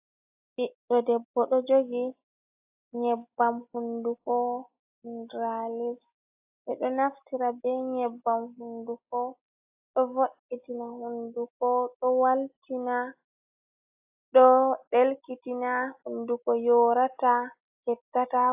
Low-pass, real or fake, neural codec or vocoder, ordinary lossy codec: 3.6 kHz; real; none; AAC, 32 kbps